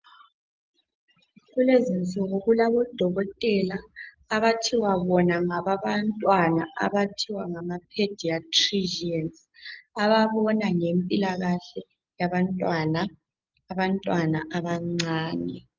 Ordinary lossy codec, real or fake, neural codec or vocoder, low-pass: Opus, 32 kbps; real; none; 7.2 kHz